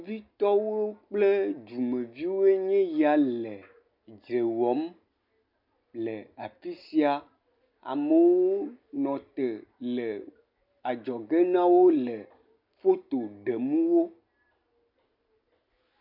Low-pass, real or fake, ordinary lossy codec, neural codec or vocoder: 5.4 kHz; real; MP3, 48 kbps; none